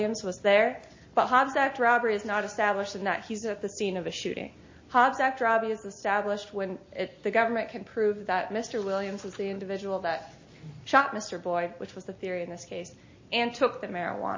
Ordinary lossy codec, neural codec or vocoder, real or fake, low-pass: MP3, 32 kbps; none; real; 7.2 kHz